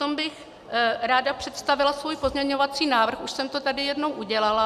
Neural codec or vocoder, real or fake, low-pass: none; real; 14.4 kHz